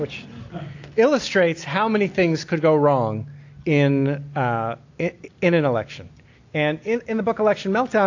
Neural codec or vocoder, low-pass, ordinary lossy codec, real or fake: none; 7.2 kHz; AAC, 48 kbps; real